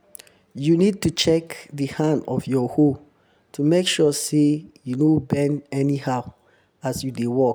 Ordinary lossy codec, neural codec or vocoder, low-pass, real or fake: none; none; none; real